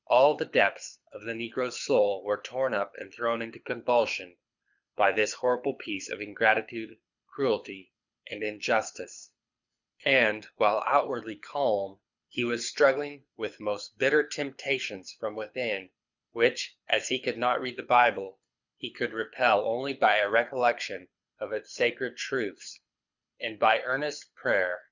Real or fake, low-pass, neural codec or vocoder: fake; 7.2 kHz; codec, 24 kHz, 6 kbps, HILCodec